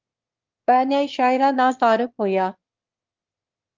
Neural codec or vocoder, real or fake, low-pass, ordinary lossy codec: autoencoder, 22.05 kHz, a latent of 192 numbers a frame, VITS, trained on one speaker; fake; 7.2 kHz; Opus, 32 kbps